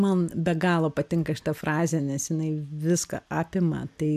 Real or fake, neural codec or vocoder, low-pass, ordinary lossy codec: real; none; 14.4 kHz; AAC, 96 kbps